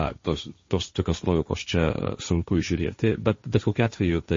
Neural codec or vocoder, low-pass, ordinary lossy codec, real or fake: codec, 16 kHz, 1.1 kbps, Voila-Tokenizer; 7.2 kHz; MP3, 32 kbps; fake